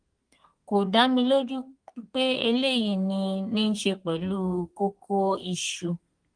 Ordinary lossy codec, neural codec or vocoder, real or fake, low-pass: Opus, 24 kbps; codec, 32 kHz, 1.9 kbps, SNAC; fake; 9.9 kHz